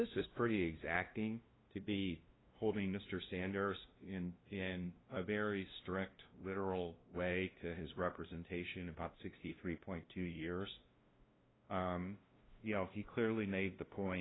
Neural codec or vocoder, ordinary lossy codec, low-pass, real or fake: codec, 16 kHz, 0.5 kbps, FunCodec, trained on LibriTTS, 25 frames a second; AAC, 16 kbps; 7.2 kHz; fake